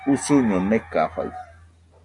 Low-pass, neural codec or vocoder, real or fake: 10.8 kHz; none; real